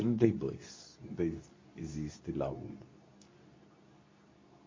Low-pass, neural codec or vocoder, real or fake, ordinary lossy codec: 7.2 kHz; codec, 24 kHz, 0.9 kbps, WavTokenizer, medium speech release version 2; fake; MP3, 32 kbps